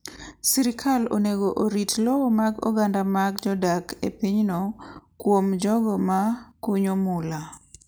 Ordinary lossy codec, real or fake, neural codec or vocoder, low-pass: none; real; none; none